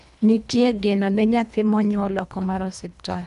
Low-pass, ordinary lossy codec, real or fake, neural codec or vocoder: 10.8 kHz; none; fake; codec, 24 kHz, 1.5 kbps, HILCodec